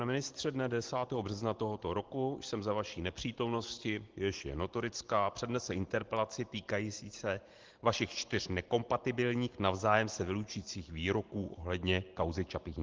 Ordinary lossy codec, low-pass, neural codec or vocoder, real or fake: Opus, 16 kbps; 7.2 kHz; none; real